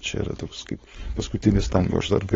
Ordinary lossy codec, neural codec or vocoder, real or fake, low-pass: AAC, 24 kbps; codec, 16 kHz, 4.8 kbps, FACodec; fake; 7.2 kHz